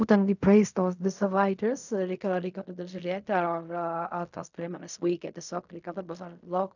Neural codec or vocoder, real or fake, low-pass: codec, 16 kHz in and 24 kHz out, 0.4 kbps, LongCat-Audio-Codec, fine tuned four codebook decoder; fake; 7.2 kHz